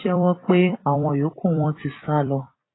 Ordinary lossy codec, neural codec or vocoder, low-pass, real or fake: AAC, 16 kbps; vocoder, 44.1 kHz, 128 mel bands every 256 samples, BigVGAN v2; 7.2 kHz; fake